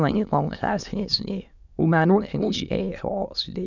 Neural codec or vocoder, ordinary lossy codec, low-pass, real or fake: autoencoder, 22.05 kHz, a latent of 192 numbers a frame, VITS, trained on many speakers; none; 7.2 kHz; fake